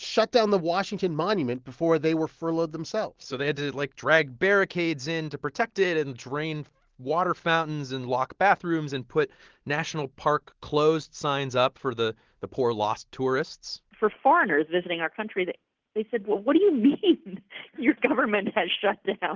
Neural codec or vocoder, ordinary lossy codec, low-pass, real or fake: none; Opus, 16 kbps; 7.2 kHz; real